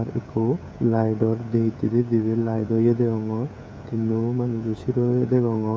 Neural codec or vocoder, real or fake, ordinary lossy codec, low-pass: codec, 16 kHz, 16 kbps, FreqCodec, smaller model; fake; none; none